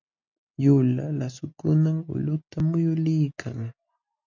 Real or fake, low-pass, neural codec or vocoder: real; 7.2 kHz; none